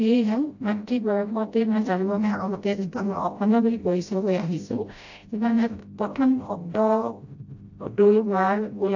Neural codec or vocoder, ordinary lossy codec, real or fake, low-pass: codec, 16 kHz, 0.5 kbps, FreqCodec, smaller model; AAC, 48 kbps; fake; 7.2 kHz